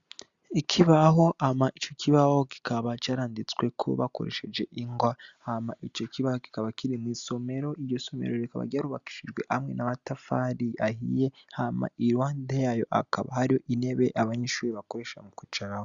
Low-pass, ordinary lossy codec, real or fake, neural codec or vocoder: 7.2 kHz; Opus, 64 kbps; real; none